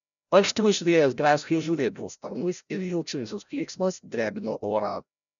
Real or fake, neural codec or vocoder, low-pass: fake; codec, 16 kHz, 0.5 kbps, FreqCodec, larger model; 7.2 kHz